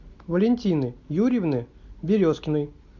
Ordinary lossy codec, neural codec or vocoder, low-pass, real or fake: MP3, 64 kbps; none; 7.2 kHz; real